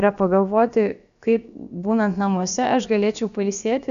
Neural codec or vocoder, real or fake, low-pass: codec, 16 kHz, about 1 kbps, DyCAST, with the encoder's durations; fake; 7.2 kHz